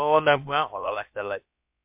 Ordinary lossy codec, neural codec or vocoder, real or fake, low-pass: MP3, 32 kbps; codec, 16 kHz, about 1 kbps, DyCAST, with the encoder's durations; fake; 3.6 kHz